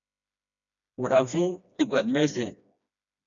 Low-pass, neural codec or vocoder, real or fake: 7.2 kHz; codec, 16 kHz, 1 kbps, FreqCodec, smaller model; fake